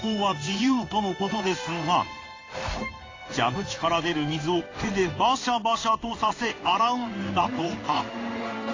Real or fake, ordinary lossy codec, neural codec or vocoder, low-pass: fake; AAC, 32 kbps; codec, 16 kHz in and 24 kHz out, 1 kbps, XY-Tokenizer; 7.2 kHz